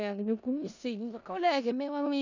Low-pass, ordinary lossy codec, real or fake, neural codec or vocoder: 7.2 kHz; none; fake; codec, 16 kHz in and 24 kHz out, 0.4 kbps, LongCat-Audio-Codec, four codebook decoder